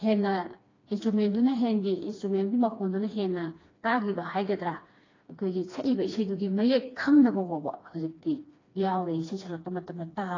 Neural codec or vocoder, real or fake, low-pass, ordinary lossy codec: codec, 16 kHz, 2 kbps, FreqCodec, smaller model; fake; 7.2 kHz; AAC, 32 kbps